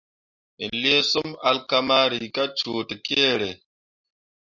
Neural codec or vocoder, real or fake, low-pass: none; real; 7.2 kHz